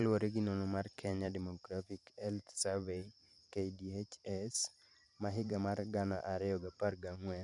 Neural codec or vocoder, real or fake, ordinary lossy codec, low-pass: vocoder, 44.1 kHz, 128 mel bands every 512 samples, BigVGAN v2; fake; none; 10.8 kHz